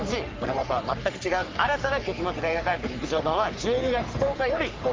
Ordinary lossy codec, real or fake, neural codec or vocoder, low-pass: Opus, 32 kbps; fake; codec, 44.1 kHz, 3.4 kbps, Pupu-Codec; 7.2 kHz